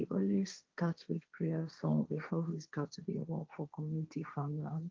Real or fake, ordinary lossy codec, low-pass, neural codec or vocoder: fake; Opus, 24 kbps; 7.2 kHz; codec, 16 kHz, 1.1 kbps, Voila-Tokenizer